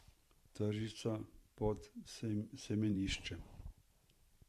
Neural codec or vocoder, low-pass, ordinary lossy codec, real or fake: vocoder, 44.1 kHz, 128 mel bands every 512 samples, BigVGAN v2; 14.4 kHz; Opus, 64 kbps; fake